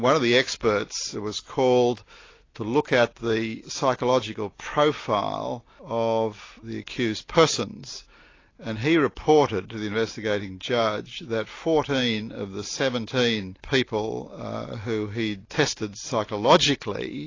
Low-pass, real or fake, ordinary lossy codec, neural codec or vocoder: 7.2 kHz; real; AAC, 32 kbps; none